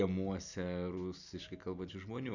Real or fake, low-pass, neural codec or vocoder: real; 7.2 kHz; none